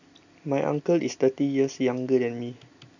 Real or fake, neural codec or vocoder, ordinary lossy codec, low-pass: real; none; none; 7.2 kHz